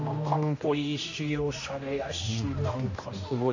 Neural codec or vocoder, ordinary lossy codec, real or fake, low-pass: codec, 16 kHz, 1 kbps, X-Codec, HuBERT features, trained on general audio; AAC, 48 kbps; fake; 7.2 kHz